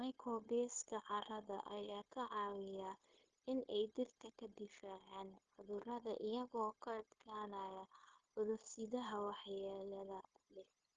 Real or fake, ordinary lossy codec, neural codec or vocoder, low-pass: fake; Opus, 16 kbps; codec, 16 kHz, 0.9 kbps, LongCat-Audio-Codec; 7.2 kHz